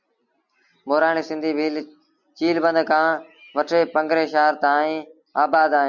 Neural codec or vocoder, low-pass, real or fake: none; 7.2 kHz; real